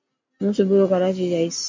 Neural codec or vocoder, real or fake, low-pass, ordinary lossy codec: none; real; 7.2 kHz; MP3, 48 kbps